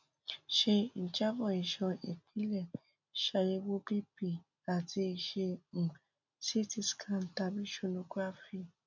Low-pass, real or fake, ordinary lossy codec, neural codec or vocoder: 7.2 kHz; real; none; none